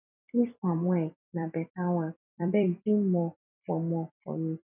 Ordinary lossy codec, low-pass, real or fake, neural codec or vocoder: none; 3.6 kHz; fake; vocoder, 44.1 kHz, 128 mel bands every 256 samples, BigVGAN v2